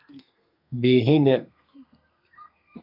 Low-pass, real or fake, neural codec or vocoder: 5.4 kHz; fake; codec, 32 kHz, 1.9 kbps, SNAC